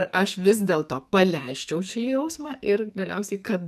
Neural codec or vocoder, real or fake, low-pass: codec, 44.1 kHz, 2.6 kbps, SNAC; fake; 14.4 kHz